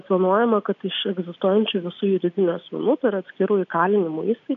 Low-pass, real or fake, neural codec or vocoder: 7.2 kHz; real; none